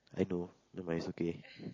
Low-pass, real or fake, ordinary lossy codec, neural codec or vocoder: 7.2 kHz; real; MP3, 32 kbps; none